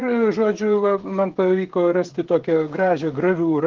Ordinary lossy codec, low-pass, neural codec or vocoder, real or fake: Opus, 16 kbps; 7.2 kHz; codec, 16 kHz, 16 kbps, FreqCodec, smaller model; fake